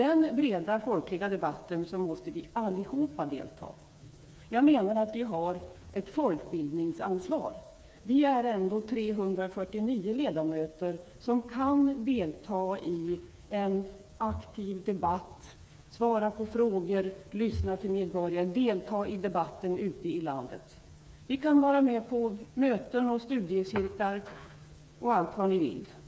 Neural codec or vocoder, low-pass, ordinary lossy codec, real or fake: codec, 16 kHz, 4 kbps, FreqCodec, smaller model; none; none; fake